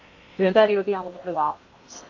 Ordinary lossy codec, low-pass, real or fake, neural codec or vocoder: AAC, 48 kbps; 7.2 kHz; fake; codec, 16 kHz in and 24 kHz out, 0.8 kbps, FocalCodec, streaming, 65536 codes